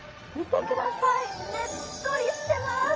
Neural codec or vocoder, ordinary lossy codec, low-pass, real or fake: vocoder, 22.05 kHz, 80 mel bands, Vocos; Opus, 24 kbps; 7.2 kHz; fake